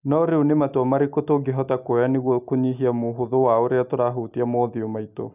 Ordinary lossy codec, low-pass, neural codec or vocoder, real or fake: none; 3.6 kHz; none; real